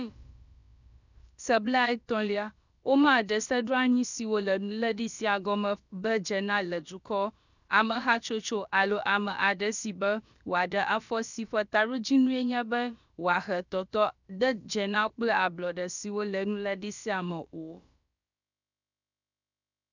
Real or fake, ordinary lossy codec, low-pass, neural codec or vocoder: fake; none; 7.2 kHz; codec, 16 kHz, about 1 kbps, DyCAST, with the encoder's durations